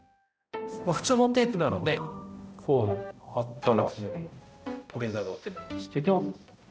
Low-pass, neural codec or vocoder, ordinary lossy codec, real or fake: none; codec, 16 kHz, 0.5 kbps, X-Codec, HuBERT features, trained on balanced general audio; none; fake